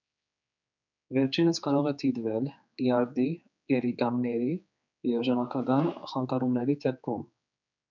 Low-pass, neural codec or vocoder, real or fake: 7.2 kHz; codec, 16 kHz, 4 kbps, X-Codec, HuBERT features, trained on general audio; fake